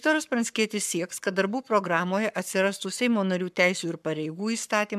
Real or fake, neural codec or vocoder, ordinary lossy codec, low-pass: fake; codec, 44.1 kHz, 7.8 kbps, Pupu-Codec; MP3, 96 kbps; 14.4 kHz